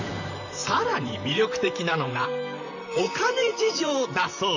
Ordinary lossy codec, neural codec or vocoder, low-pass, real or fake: none; vocoder, 44.1 kHz, 128 mel bands, Pupu-Vocoder; 7.2 kHz; fake